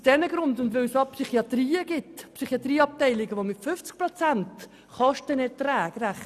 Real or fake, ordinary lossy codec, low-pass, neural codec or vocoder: real; none; 14.4 kHz; none